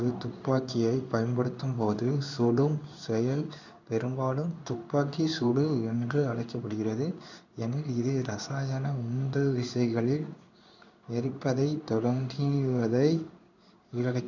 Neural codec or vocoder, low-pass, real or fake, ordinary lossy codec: codec, 16 kHz in and 24 kHz out, 1 kbps, XY-Tokenizer; 7.2 kHz; fake; none